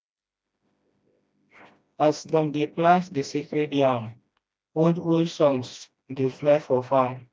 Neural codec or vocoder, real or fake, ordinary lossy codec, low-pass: codec, 16 kHz, 1 kbps, FreqCodec, smaller model; fake; none; none